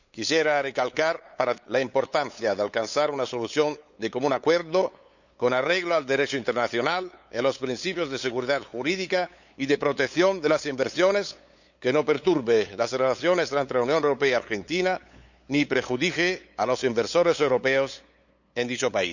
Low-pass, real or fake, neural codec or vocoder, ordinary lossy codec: 7.2 kHz; fake; codec, 16 kHz, 8 kbps, FunCodec, trained on LibriTTS, 25 frames a second; none